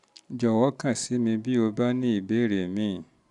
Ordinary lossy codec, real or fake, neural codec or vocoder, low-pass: none; real; none; 10.8 kHz